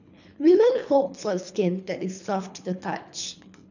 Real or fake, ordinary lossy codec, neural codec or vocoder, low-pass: fake; none; codec, 24 kHz, 3 kbps, HILCodec; 7.2 kHz